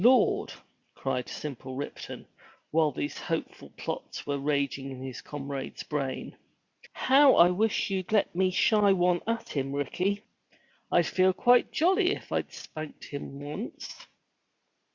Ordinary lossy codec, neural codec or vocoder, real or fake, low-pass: Opus, 64 kbps; none; real; 7.2 kHz